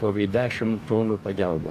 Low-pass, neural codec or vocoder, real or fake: 14.4 kHz; codec, 44.1 kHz, 2.6 kbps, DAC; fake